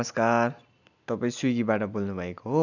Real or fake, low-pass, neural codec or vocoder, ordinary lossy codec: real; 7.2 kHz; none; none